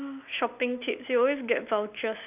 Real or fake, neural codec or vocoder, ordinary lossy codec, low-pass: real; none; none; 3.6 kHz